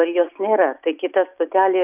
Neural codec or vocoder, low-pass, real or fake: none; 3.6 kHz; real